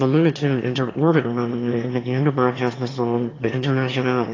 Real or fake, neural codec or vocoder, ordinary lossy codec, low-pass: fake; autoencoder, 22.05 kHz, a latent of 192 numbers a frame, VITS, trained on one speaker; AAC, 32 kbps; 7.2 kHz